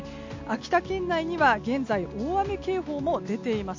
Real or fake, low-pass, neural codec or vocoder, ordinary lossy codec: real; 7.2 kHz; none; MP3, 64 kbps